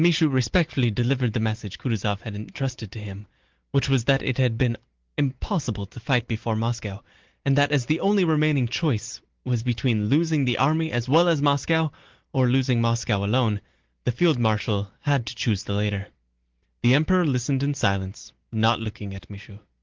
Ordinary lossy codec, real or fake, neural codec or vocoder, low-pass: Opus, 16 kbps; real; none; 7.2 kHz